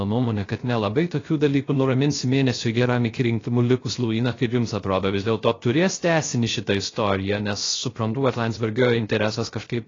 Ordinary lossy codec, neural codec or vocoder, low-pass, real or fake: AAC, 32 kbps; codec, 16 kHz, 0.3 kbps, FocalCodec; 7.2 kHz; fake